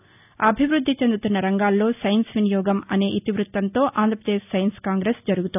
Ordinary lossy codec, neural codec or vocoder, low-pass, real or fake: none; none; 3.6 kHz; real